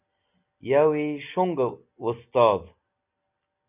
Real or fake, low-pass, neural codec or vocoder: real; 3.6 kHz; none